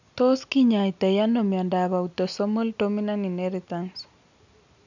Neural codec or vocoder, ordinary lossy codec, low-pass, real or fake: none; none; 7.2 kHz; real